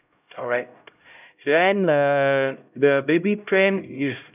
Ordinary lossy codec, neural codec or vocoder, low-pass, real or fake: none; codec, 16 kHz, 0.5 kbps, X-Codec, HuBERT features, trained on LibriSpeech; 3.6 kHz; fake